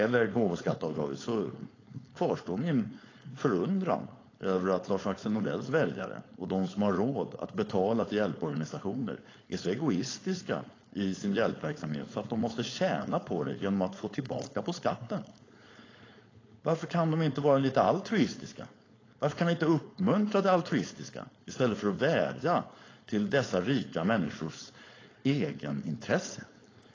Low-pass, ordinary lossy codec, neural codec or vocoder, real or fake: 7.2 kHz; AAC, 32 kbps; codec, 16 kHz, 4.8 kbps, FACodec; fake